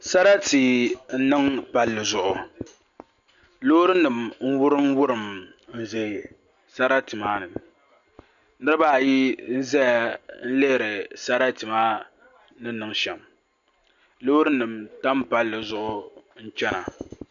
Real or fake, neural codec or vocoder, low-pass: real; none; 7.2 kHz